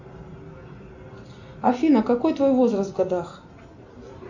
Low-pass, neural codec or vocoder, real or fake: 7.2 kHz; none; real